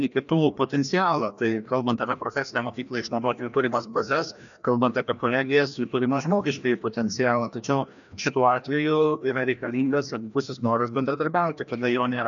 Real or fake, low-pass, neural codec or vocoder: fake; 7.2 kHz; codec, 16 kHz, 1 kbps, FreqCodec, larger model